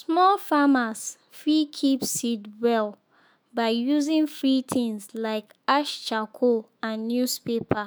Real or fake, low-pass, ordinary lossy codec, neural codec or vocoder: fake; none; none; autoencoder, 48 kHz, 128 numbers a frame, DAC-VAE, trained on Japanese speech